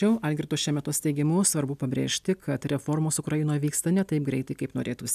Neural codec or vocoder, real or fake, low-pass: none; real; 14.4 kHz